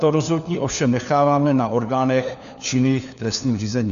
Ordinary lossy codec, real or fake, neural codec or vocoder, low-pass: MP3, 96 kbps; fake; codec, 16 kHz, 4 kbps, FunCodec, trained on LibriTTS, 50 frames a second; 7.2 kHz